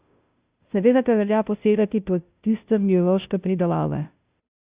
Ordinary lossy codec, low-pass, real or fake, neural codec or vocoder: Opus, 64 kbps; 3.6 kHz; fake; codec, 16 kHz, 0.5 kbps, FunCodec, trained on Chinese and English, 25 frames a second